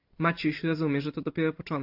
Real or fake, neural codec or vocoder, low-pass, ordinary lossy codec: real; none; 5.4 kHz; AAC, 48 kbps